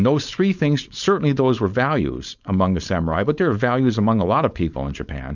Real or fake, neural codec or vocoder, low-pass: fake; codec, 16 kHz, 4.8 kbps, FACodec; 7.2 kHz